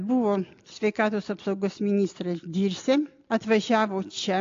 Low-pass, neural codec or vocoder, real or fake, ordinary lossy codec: 7.2 kHz; none; real; AAC, 48 kbps